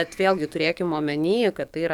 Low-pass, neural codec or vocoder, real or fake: 19.8 kHz; codec, 44.1 kHz, 7.8 kbps, DAC; fake